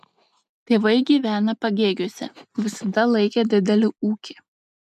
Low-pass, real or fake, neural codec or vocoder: 14.4 kHz; real; none